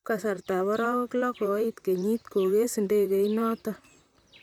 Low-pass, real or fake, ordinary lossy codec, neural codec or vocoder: 19.8 kHz; fake; none; vocoder, 44.1 kHz, 128 mel bands, Pupu-Vocoder